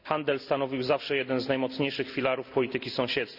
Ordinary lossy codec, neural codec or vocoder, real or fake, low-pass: none; none; real; 5.4 kHz